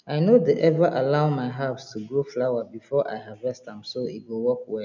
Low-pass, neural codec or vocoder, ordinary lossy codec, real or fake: 7.2 kHz; none; none; real